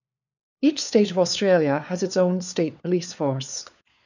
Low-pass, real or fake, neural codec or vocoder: 7.2 kHz; fake; codec, 16 kHz, 4 kbps, FunCodec, trained on LibriTTS, 50 frames a second